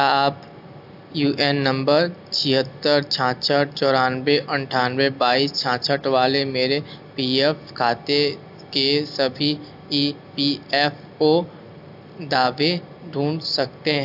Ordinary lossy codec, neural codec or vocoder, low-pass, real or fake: AAC, 48 kbps; none; 5.4 kHz; real